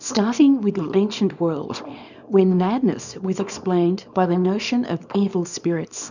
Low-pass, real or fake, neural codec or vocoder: 7.2 kHz; fake; codec, 24 kHz, 0.9 kbps, WavTokenizer, small release